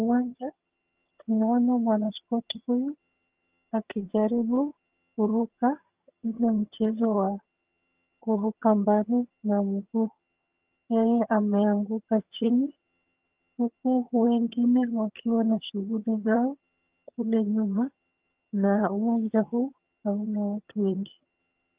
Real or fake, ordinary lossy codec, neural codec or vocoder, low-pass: fake; Opus, 32 kbps; vocoder, 22.05 kHz, 80 mel bands, HiFi-GAN; 3.6 kHz